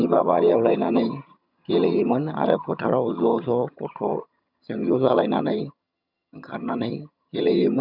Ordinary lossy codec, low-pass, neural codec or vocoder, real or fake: none; 5.4 kHz; vocoder, 22.05 kHz, 80 mel bands, HiFi-GAN; fake